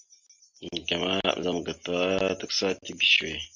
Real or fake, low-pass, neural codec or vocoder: real; 7.2 kHz; none